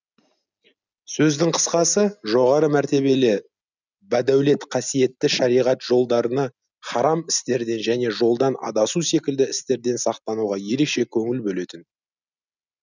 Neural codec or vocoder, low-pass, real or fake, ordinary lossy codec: none; 7.2 kHz; real; none